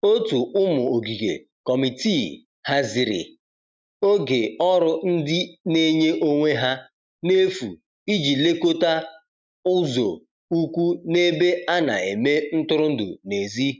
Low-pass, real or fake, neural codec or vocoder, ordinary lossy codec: none; real; none; none